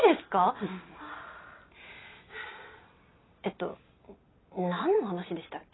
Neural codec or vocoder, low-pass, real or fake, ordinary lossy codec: none; 7.2 kHz; real; AAC, 16 kbps